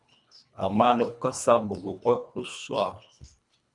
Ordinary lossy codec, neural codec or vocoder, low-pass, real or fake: MP3, 96 kbps; codec, 24 kHz, 1.5 kbps, HILCodec; 10.8 kHz; fake